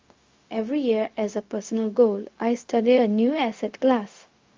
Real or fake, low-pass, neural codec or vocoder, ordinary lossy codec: fake; 7.2 kHz; codec, 16 kHz, 0.4 kbps, LongCat-Audio-Codec; Opus, 32 kbps